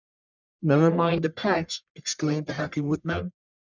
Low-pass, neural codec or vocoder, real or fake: 7.2 kHz; codec, 44.1 kHz, 1.7 kbps, Pupu-Codec; fake